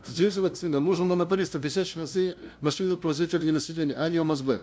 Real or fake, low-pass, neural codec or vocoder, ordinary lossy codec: fake; none; codec, 16 kHz, 0.5 kbps, FunCodec, trained on LibriTTS, 25 frames a second; none